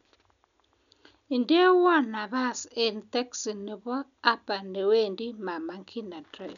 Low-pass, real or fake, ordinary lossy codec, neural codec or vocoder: 7.2 kHz; real; none; none